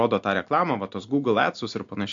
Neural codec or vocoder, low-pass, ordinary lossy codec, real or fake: none; 7.2 kHz; AAC, 64 kbps; real